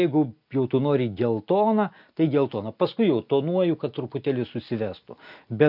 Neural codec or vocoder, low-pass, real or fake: none; 5.4 kHz; real